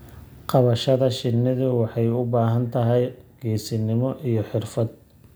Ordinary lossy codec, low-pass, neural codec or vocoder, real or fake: none; none; none; real